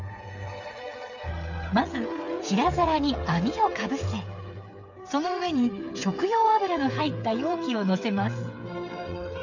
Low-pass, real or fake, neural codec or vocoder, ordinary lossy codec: 7.2 kHz; fake; codec, 16 kHz, 8 kbps, FreqCodec, smaller model; none